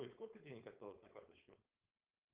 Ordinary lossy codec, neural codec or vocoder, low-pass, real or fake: Opus, 24 kbps; codec, 16 kHz, 4.8 kbps, FACodec; 3.6 kHz; fake